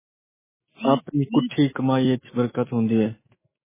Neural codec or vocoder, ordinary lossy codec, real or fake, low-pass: autoencoder, 48 kHz, 128 numbers a frame, DAC-VAE, trained on Japanese speech; MP3, 16 kbps; fake; 3.6 kHz